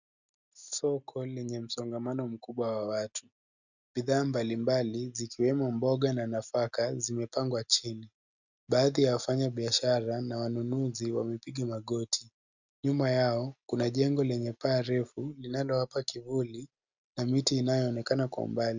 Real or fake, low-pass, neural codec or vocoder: real; 7.2 kHz; none